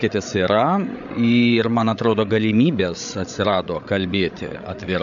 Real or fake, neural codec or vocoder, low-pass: fake; codec, 16 kHz, 8 kbps, FreqCodec, larger model; 7.2 kHz